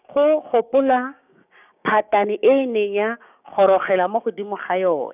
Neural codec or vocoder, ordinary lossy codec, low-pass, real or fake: codec, 16 kHz, 6 kbps, DAC; none; 3.6 kHz; fake